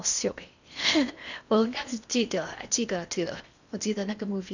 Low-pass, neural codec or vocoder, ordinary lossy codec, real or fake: 7.2 kHz; codec, 16 kHz in and 24 kHz out, 0.8 kbps, FocalCodec, streaming, 65536 codes; none; fake